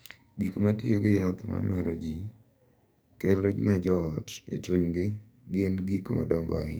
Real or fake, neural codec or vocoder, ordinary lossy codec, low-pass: fake; codec, 44.1 kHz, 2.6 kbps, SNAC; none; none